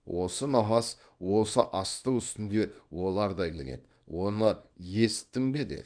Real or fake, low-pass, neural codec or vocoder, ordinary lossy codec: fake; 9.9 kHz; codec, 24 kHz, 0.9 kbps, WavTokenizer, small release; none